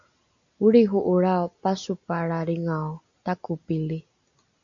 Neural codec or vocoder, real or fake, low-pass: none; real; 7.2 kHz